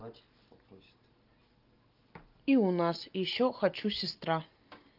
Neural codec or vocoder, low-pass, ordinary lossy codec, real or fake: none; 5.4 kHz; Opus, 32 kbps; real